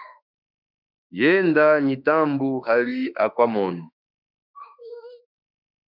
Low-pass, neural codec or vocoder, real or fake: 5.4 kHz; autoencoder, 48 kHz, 32 numbers a frame, DAC-VAE, trained on Japanese speech; fake